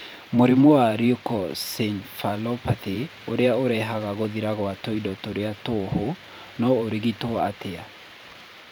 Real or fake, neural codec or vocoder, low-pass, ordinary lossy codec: fake; vocoder, 44.1 kHz, 128 mel bands every 512 samples, BigVGAN v2; none; none